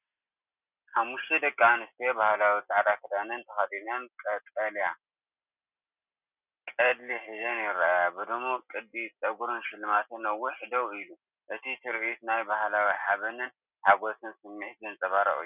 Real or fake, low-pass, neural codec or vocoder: real; 3.6 kHz; none